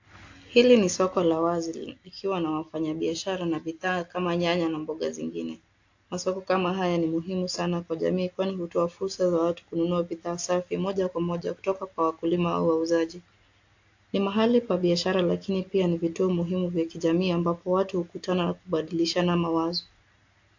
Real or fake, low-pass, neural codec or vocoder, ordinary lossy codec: real; 7.2 kHz; none; AAC, 48 kbps